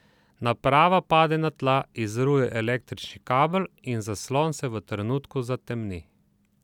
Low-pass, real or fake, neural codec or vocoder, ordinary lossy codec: 19.8 kHz; real; none; none